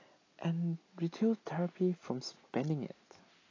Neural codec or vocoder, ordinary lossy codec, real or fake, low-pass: none; AAC, 32 kbps; real; 7.2 kHz